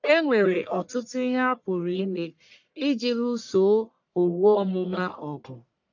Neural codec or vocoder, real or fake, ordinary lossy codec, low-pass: codec, 44.1 kHz, 1.7 kbps, Pupu-Codec; fake; none; 7.2 kHz